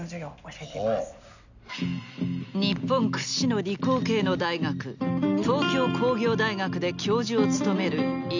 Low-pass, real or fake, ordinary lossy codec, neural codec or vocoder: 7.2 kHz; real; none; none